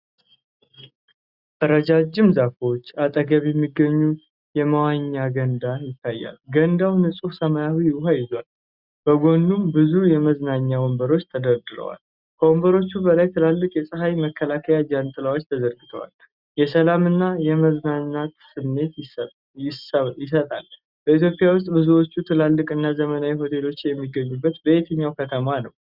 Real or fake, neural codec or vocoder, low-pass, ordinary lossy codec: real; none; 5.4 kHz; Opus, 64 kbps